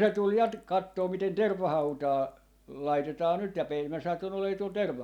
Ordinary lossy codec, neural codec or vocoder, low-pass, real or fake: none; none; 19.8 kHz; real